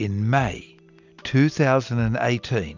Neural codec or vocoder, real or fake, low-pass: none; real; 7.2 kHz